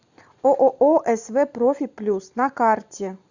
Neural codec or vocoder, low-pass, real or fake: none; 7.2 kHz; real